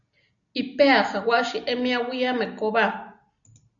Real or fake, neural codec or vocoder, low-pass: real; none; 7.2 kHz